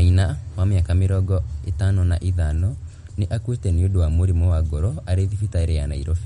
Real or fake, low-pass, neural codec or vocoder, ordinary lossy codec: real; 19.8 kHz; none; MP3, 48 kbps